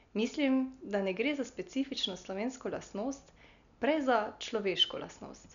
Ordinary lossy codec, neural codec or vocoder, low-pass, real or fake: none; none; 7.2 kHz; real